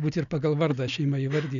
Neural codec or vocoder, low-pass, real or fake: none; 7.2 kHz; real